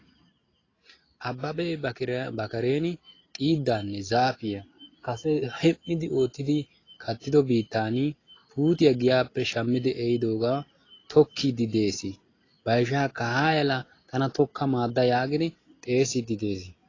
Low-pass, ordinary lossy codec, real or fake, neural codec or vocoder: 7.2 kHz; AAC, 32 kbps; real; none